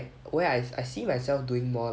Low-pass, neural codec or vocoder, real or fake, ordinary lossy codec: none; none; real; none